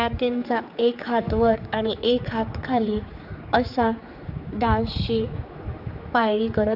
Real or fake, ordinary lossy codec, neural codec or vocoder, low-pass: fake; none; codec, 16 kHz, 4 kbps, X-Codec, HuBERT features, trained on general audio; 5.4 kHz